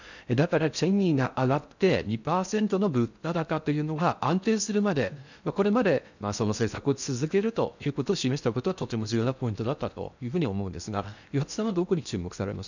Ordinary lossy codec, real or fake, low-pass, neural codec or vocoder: none; fake; 7.2 kHz; codec, 16 kHz in and 24 kHz out, 0.6 kbps, FocalCodec, streaming, 4096 codes